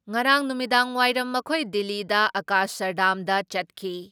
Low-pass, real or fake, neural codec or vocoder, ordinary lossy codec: none; real; none; none